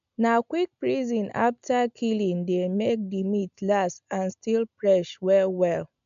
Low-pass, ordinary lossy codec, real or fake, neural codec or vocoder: 7.2 kHz; AAC, 96 kbps; real; none